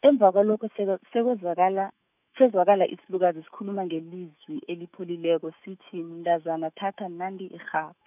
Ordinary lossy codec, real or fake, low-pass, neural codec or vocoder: none; fake; 3.6 kHz; autoencoder, 48 kHz, 128 numbers a frame, DAC-VAE, trained on Japanese speech